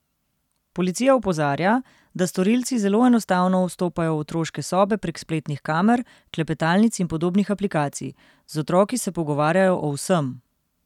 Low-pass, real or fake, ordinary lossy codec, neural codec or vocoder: 19.8 kHz; real; none; none